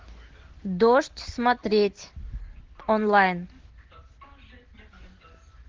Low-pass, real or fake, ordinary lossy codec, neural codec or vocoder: 7.2 kHz; real; Opus, 16 kbps; none